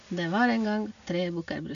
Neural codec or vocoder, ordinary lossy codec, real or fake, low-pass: none; none; real; 7.2 kHz